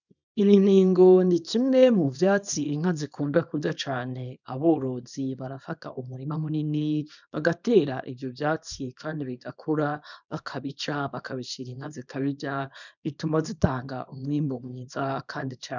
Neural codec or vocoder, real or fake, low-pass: codec, 24 kHz, 0.9 kbps, WavTokenizer, small release; fake; 7.2 kHz